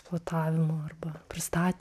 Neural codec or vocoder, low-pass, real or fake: none; 14.4 kHz; real